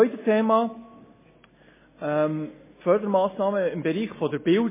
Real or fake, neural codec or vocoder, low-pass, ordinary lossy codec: real; none; 3.6 kHz; MP3, 16 kbps